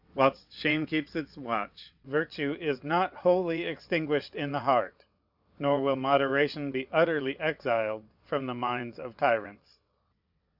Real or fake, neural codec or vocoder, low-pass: fake; vocoder, 22.05 kHz, 80 mel bands, WaveNeXt; 5.4 kHz